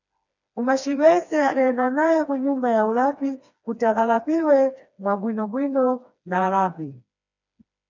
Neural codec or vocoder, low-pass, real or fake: codec, 16 kHz, 2 kbps, FreqCodec, smaller model; 7.2 kHz; fake